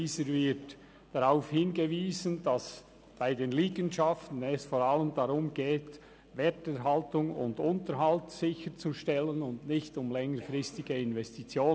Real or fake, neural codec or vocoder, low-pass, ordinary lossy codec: real; none; none; none